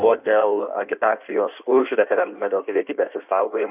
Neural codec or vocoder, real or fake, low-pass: codec, 16 kHz in and 24 kHz out, 1.1 kbps, FireRedTTS-2 codec; fake; 3.6 kHz